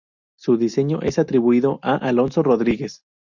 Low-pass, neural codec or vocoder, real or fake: 7.2 kHz; none; real